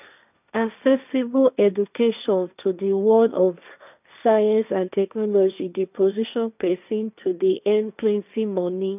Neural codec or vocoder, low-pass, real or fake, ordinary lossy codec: codec, 16 kHz, 1.1 kbps, Voila-Tokenizer; 3.6 kHz; fake; none